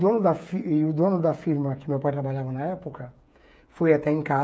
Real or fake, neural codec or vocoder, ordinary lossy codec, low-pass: fake; codec, 16 kHz, 8 kbps, FreqCodec, smaller model; none; none